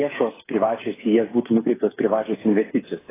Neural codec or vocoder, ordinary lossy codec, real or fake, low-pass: codec, 16 kHz, 8 kbps, FreqCodec, smaller model; AAC, 16 kbps; fake; 3.6 kHz